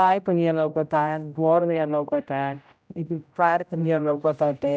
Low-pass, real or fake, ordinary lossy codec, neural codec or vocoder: none; fake; none; codec, 16 kHz, 0.5 kbps, X-Codec, HuBERT features, trained on general audio